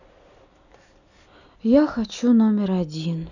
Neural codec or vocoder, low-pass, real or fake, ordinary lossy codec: none; 7.2 kHz; real; none